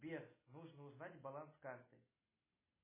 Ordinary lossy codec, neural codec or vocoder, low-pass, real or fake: MP3, 16 kbps; none; 3.6 kHz; real